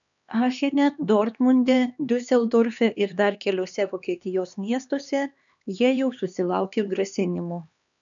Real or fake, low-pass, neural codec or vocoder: fake; 7.2 kHz; codec, 16 kHz, 2 kbps, X-Codec, HuBERT features, trained on LibriSpeech